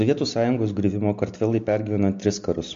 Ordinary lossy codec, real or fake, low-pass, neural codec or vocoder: MP3, 48 kbps; real; 7.2 kHz; none